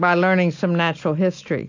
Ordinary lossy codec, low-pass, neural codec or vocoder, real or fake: AAC, 48 kbps; 7.2 kHz; none; real